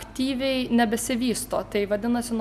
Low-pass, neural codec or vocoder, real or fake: 14.4 kHz; none; real